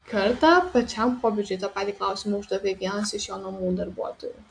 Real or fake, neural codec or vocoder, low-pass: real; none; 9.9 kHz